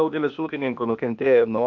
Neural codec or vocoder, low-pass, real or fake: codec, 16 kHz, 0.8 kbps, ZipCodec; 7.2 kHz; fake